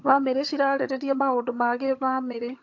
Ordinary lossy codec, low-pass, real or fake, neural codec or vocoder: AAC, 48 kbps; 7.2 kHz; fake; vocoder, 22.05 kHz, 80 mel bands, HiFi-GAN